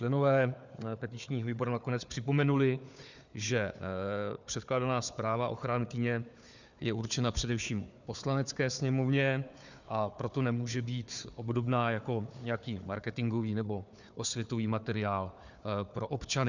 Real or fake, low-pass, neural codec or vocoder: fake; 7.2 kHz; codec, 16 kHz, 4 kbps, FunCodec, trained on Chinese and English, 50 frames a second